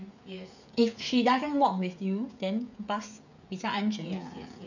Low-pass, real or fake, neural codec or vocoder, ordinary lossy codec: 7.2 kHz; fake; codec, 16 kHz, 16 kbps, FreqCodec, smaller model; none